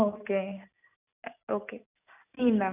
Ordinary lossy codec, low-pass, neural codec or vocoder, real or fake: none; 3.6 kHz; none; real